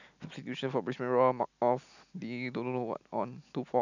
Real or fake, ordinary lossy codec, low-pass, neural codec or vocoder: real; none; 7.2 kHz; none